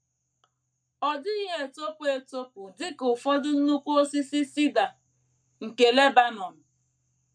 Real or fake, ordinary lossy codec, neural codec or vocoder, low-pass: fake; none; codec, 44.1 kHz, 7.8 kbps, Pupu-Codec; 9.9 kHz